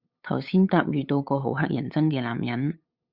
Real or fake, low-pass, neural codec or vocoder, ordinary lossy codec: fake; 5.4 kHz; codec, 16 kHz, 8 kbps, FunCodec, trained on LibriTTS, 25 frames a second; Opus, 64 kbps